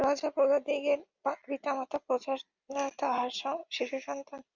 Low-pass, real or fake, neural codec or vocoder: 7.2 kHz; real; none